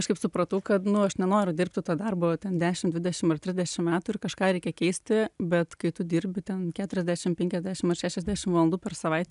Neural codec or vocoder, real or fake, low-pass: none; real; 10.8 kHz